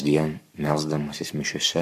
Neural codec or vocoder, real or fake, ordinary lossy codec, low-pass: codec, 44.1 kHz, 7.8 kbps, Pupu-Codec; fake; AAC, 96 kbps; 14.4 kHz